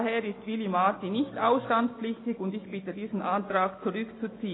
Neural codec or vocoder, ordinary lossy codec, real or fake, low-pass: none; AAC, 16 kbps; real; 7.2 kHz